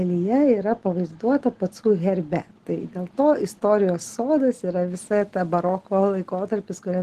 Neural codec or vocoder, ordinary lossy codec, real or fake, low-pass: none; Opus, 16 kbps; real; 14.4 kHz